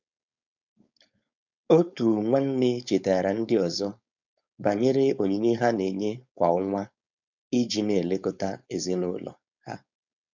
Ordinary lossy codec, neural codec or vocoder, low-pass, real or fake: none; codec, 16 kHz, 4.8 kbps, FACodec; 7.2 kHz; fake